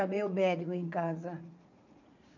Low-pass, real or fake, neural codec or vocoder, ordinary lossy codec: 7.2 kHz; fake; vocoder, 44.1 kHz, 80 mel bands, Vocos; none